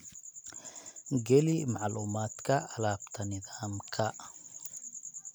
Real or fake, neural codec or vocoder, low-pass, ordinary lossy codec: real; none; none; none